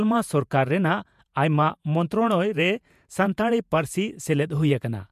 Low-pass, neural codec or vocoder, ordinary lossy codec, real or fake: 14.4 kHz; vocoder, 48 kHz, 128 mel bands, Vocos; MP3, 96 kbps; fake